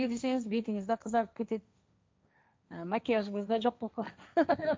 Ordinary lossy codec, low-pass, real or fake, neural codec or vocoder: none; none; fake; codec, 16 kHz, 1.1 kbps, Voila-Tokenizer